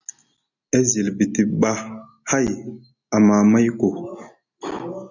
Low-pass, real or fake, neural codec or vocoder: 7.2 kHz; real; none